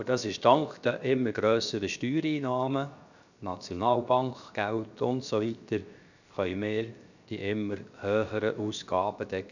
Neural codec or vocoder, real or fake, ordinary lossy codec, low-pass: codec, 16 kHz, 0.7 kbps, FocalCodec; fake; none; 7.2 kHz